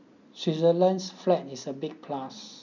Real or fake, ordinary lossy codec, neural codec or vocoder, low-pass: real; none; none; 7.2 kHz